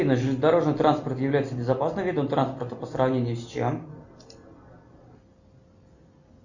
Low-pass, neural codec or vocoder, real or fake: 7.2 kHz; none; real